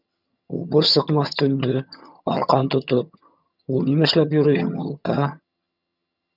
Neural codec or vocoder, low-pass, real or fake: vocoder, 22.05 kHz, 80 mel bands, HiFi-GAN; 5.4 kHz; fake